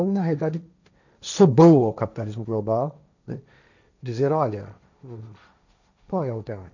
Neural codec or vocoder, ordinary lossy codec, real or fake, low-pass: codec, 16 kHz, 1.1 kbps, Voila-Tokenizer; none; fake; 7.2 kHz